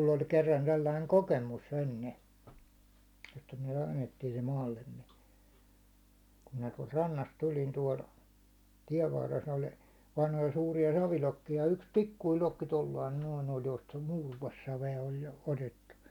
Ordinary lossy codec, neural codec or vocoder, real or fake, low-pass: none; none; real; 19.8 kHz